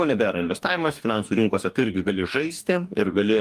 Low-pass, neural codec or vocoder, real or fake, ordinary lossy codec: 14.4 kHz; codec, 44.1 kHz, 2.6 kbps, DAC; fake; Opus, 32 kbps